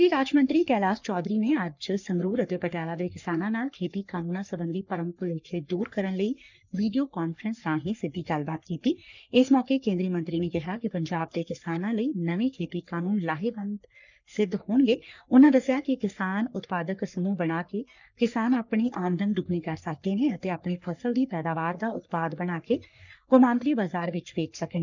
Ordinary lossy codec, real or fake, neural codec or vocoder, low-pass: none; fake; codec, 44.1 kHz, 3.4 kbps, Pupu-Codec; 7.2 kHz